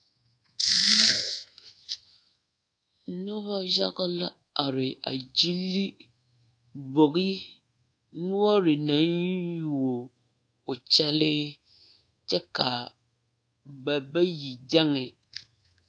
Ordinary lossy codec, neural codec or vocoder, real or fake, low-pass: AAC, 48 kbps; codec, 24 kHz, 1.2 kbps, DualCodec; fake; 9.9 kHz